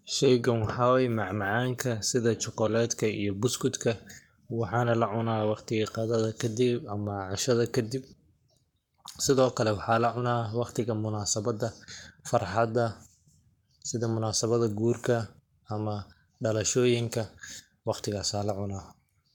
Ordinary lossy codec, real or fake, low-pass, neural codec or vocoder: none; fake; 19.8 kHz; codec, 44.1 kHz, 7.8 kbps, Pupu-Codec